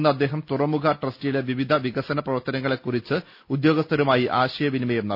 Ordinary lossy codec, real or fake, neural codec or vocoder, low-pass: none; real; none; 5.4 kHz